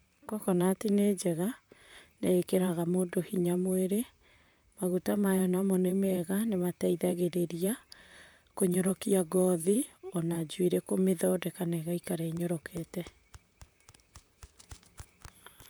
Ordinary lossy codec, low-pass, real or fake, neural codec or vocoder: none; none; fake; vocoder, 44.1 kHz, 128 mel bands, Pupu-Vocoder